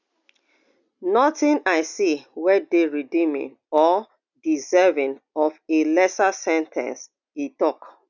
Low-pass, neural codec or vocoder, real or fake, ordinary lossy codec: 7.2 kHz; none; real; none